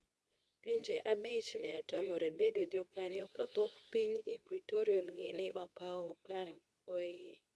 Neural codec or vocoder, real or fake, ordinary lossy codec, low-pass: codec, 24 kHz, 0.9 kbps, WavTokenizer, medium speech release version 2; fake; none; none